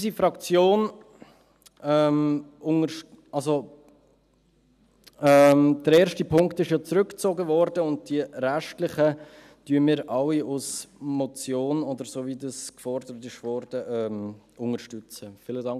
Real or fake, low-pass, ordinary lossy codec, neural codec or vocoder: real; 14.4 kHz; none; none